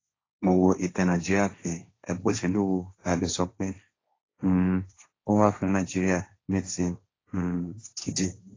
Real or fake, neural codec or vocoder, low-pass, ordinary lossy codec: fake; codec, 16 kHz, 1.1 kbps, Voila-Tokenizer; 7.2 kHz; AAC, 32 kbps